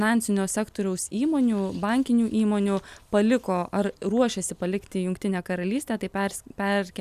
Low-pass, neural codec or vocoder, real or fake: 14.4 kHz; none; real